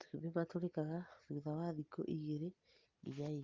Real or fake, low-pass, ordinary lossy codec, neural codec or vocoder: real; 7.2 kHz; Opus, 24 kbps; none